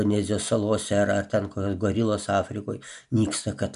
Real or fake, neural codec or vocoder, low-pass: real; none; 10.8 kHz